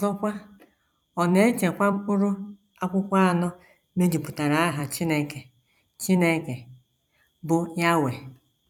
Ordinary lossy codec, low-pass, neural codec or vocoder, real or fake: none; 19.8 kHz; vocoder, 44.1 kHz, 128 mel bands every 256 samples, BigVGAN v2; fake